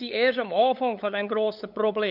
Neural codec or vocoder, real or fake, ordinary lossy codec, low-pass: codec, 16 kHz, 8 kbps, FunCodec, trained on LibriTTS, 25 frames a second; fake; none; 5.4 kHz